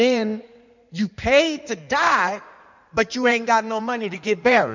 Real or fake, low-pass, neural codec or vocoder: fake; 7.2 kHz; codec, 16 kHz in and 24 kHz out, 2.2 kbps, FireRedTTS-2 codec